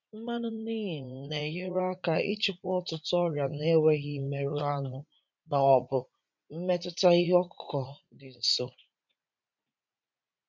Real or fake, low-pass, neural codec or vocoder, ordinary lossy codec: fake; 7.2 kHz; vocoder, 44.1 kHz, 80 mel bands, Vocos; MP3, 64 kbps